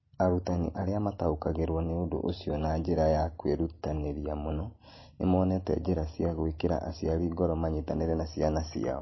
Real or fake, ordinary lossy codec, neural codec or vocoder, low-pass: real; MP3, 24 kbps; none; 7.2 kHz